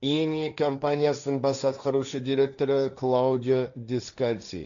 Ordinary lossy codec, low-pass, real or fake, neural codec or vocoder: none; 7.2 kHz; fake; codec, 16 kHz, 1.1 kbps, Voila-Tokenizer